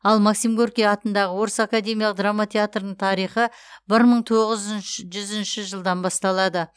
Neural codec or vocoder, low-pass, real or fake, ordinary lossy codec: none; none; real; none